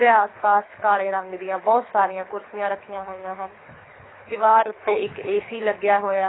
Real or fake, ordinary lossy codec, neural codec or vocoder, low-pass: fake; AAC, 16 kbps; codec, 24 kHz, 3 kbps, HILCodec; 7.2 kHz